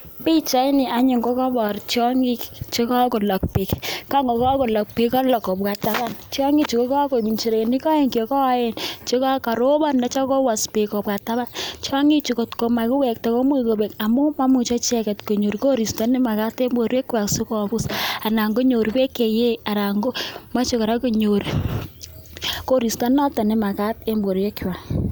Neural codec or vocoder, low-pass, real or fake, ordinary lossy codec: none; none; real; none